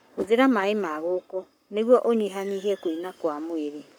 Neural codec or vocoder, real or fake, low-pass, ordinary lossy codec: codec, 44.1 kHz, 7.8 kbps, Pupu-Codec; fake; none; none